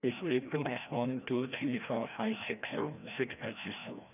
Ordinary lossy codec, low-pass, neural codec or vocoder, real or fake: none; 3.6 kHz; codec, 16 kHz, 1 kbps, FreqCodec, larger model; fake